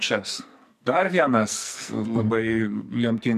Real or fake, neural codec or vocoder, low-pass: fake; codec, 44.1 kHz, 2.6 kbps, SNAC; 14.4 kHz